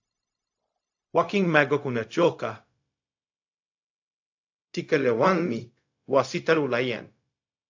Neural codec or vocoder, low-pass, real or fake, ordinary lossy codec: codec, 16 kHz, 0.4 kbps, LongCat-Audio-Codec; 7.2 kHz; fake; AAC, 48 kbps